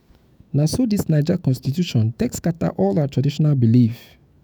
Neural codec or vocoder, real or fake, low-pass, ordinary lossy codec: autoencoder, 48 kHz, 128 numbers a frame, DAC-VAE, trained on Japanese speech; fake; none; none